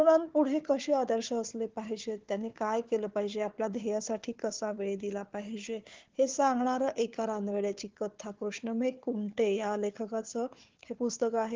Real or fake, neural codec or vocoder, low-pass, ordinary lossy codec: fake; codec, 16 kHz, 4 kbps, FunCodec, trained on Chinese and English, 50 frames a second; 7.2 kHz; Opus, 16 kbps